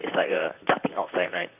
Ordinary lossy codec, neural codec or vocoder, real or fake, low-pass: none; vocoder, 44.1 kHz, 128 mel bands, Pupu-Vocoder; fake; 3.6 kHz